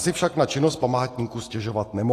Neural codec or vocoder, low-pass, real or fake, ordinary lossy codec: none; 14.4 kHz; real; AAC, 48 kbps